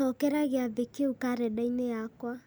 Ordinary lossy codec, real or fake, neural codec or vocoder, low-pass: none; real; none; none